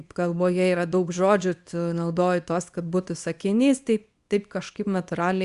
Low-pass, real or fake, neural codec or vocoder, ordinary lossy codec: 10.8 kHz; fake; codec, 24 kHz, 0.9 kbps, WavTokenizer, small release; Opus, 64 kbps